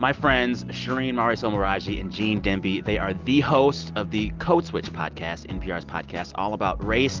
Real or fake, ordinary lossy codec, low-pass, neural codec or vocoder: real; Opus, 24 kbps; 7.2 kHz; none